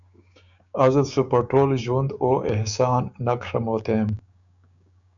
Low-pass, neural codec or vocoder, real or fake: 7.2 kHz; codec, 16 kHz, 6 kbps, DAC; fake